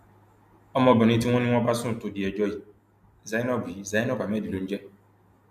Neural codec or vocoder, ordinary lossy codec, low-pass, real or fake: none; none; 14.4 kHz; real